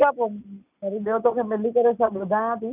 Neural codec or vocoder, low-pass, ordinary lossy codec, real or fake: none; 3.6 kHz; none; real